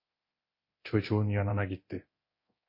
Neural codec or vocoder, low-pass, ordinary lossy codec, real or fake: codec, 24 kHz, 0.9 kbps, DualCodec; 5.4 kHz; MP3, 24 kbps; fake